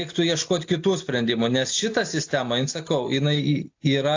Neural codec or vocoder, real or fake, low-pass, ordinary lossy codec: none; real; 7.2 kHz; AAC, 48 kbps